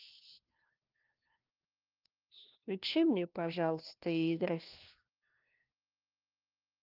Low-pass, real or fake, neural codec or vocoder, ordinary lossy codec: 5.4 kHz; fake; codec, 16 kHz, 1 kbps, FunCodec, trained on LibriTTS, 50 frames a second; Opus, 24 kbps